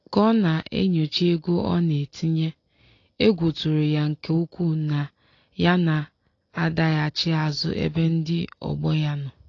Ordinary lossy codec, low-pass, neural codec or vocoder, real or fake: AAC, 32 kbps; 7.2 kHz; none; real